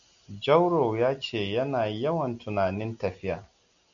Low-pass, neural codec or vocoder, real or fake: 7.2 kHz; none; real